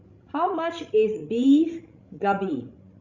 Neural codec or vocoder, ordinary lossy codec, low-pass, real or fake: codec, 16 kHz, 16 kbps, FreqCodec, larger model; Opus, 64 kbps; 7.2 kHz; fake